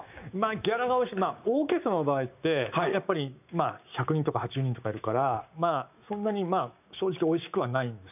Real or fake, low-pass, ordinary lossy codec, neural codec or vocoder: fake; 3.6 kHz; none; codec, 16 kHz, 6 kbps, DAC